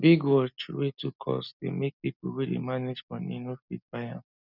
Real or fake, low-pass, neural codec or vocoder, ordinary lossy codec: real; 5.4 kHz; none; none